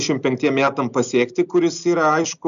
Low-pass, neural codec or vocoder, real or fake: 7.2 kHz; none; real